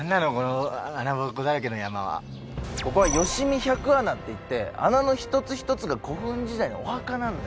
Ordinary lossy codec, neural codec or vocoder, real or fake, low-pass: none; none; real; none